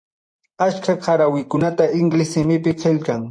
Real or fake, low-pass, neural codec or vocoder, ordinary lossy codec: fake; 9.9 kHz; vocoder, 24 kHz, 100 mel bands, Vocos; MP3, 48 kbps